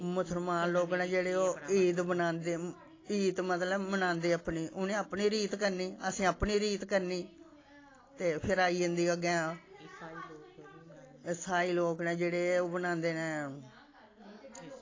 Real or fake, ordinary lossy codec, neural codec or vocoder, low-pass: real; AAC, 32 kbps; none; 7.2 kHz